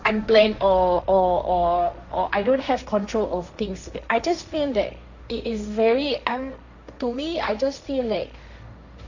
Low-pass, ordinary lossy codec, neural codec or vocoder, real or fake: 7.2 kHz; none; codec, 16 kHz, 1.1 kbps, Voila-Tokenizer; fake